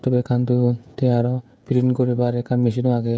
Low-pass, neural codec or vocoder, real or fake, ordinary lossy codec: none; codec, 16 kHz, 16 kbps, FreqCodec, smaller model; fake; none